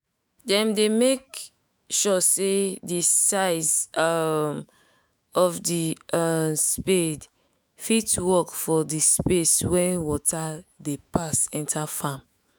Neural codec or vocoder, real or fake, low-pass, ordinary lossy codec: autoencoder, 48 kHz, 128 numbers a frame, DAC-VAE, trained on Japanese speech; fake; none; none